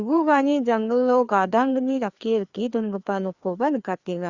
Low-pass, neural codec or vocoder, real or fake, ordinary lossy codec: 7.2 kHz; codec, 16 kHz, 2 kbps, FreqCodec, larger model; fake; Opus, 64 kbps